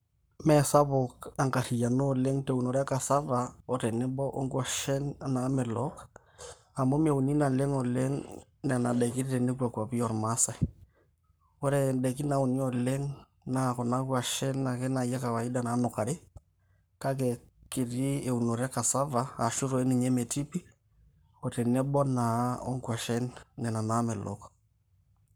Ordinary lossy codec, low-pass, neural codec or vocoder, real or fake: none; none; codec, 44.1 kHz, 7.8 kbps, Pupu-Codec; fake